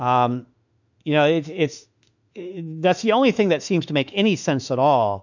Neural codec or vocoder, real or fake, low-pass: autoencoder, 48 kHz, 32 numbers a frame, DAC-VAE, trained on Japanese speech; fake; 7.2 kHz